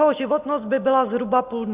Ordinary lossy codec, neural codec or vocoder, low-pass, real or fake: Opus, 32 kbps; none; 3.6 kHz; real